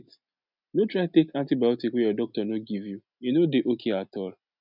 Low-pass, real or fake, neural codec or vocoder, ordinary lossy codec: 5.4 kHz; real; none; none